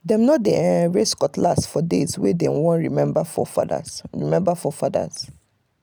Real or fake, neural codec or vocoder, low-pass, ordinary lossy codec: real; none; none; none